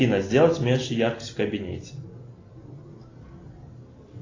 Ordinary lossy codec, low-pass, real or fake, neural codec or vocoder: AAC, 32 kbps; 7.2 kHz; real; none